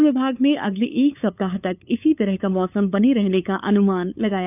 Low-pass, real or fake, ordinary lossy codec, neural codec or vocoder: 3.6 kHz; fake; none; codec, 16 kHz, 8 kbps, FunCodec, trained on LibriTTS, 25 frames a second